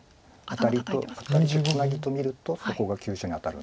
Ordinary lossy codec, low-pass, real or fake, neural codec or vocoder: none; none; real; none